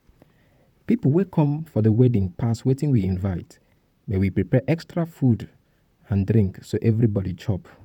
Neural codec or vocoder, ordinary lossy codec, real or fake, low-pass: vocoder, 44.1 kHz, 128 mel bands, Pupu-Vocoder; none; fake; 19.8 kHz